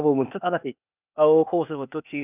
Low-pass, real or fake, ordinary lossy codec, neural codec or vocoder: 3.6 kHz; fake; none; codec, 16 kHz, 0.8 kbps, ZipCodec